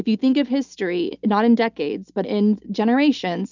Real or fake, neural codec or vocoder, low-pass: real; none; 7.2 kHz